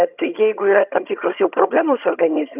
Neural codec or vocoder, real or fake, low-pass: vocoder, 22.05 kHz, 80 mel bands, HiFi-GAN; fake; 3.6 kHz